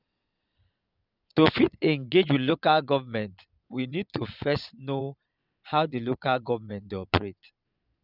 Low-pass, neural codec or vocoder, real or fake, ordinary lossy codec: 5.4 kHz; vocoder, 22.05 kHz, 80 mel bands, Vocos; fake; none